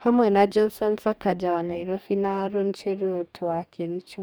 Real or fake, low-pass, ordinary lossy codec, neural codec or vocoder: fake; none; none; codec, 44.1 kHz, 2.6 kbps, DAC